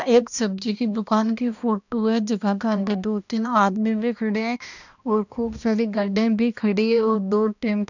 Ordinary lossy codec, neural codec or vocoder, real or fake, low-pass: none; codec, 16 kHz, 1 kbps, X-Codec, HuBERT features, trained on balanced general audio; fake; 7.2 kHz